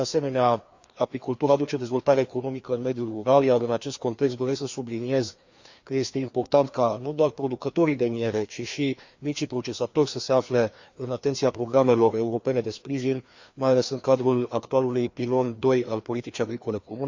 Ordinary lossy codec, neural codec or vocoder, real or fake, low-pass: Opus, 64 kbps; codec, 16 kHz, 2 kbps, FreqCodec, larger model; fake; 7.2 kHz